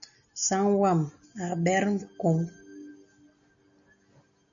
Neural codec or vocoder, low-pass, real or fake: none; 7.2 kHz; real